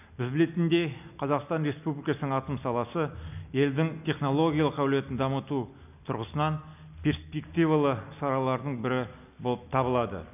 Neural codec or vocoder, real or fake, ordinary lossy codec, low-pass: none; real; none; 3.6 kHz